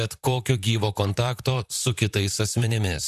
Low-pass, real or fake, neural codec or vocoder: 14.4 kHz; fake; vocoder, 44.1 kHz, 128 mel bands, Pupu-Vocoder